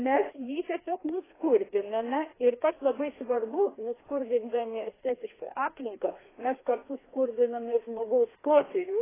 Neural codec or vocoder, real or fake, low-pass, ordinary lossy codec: codec, 16 kHz, 2 kbps, FreqCodec, larger model; fake; 3.6 kHz; AAC, 16 kbps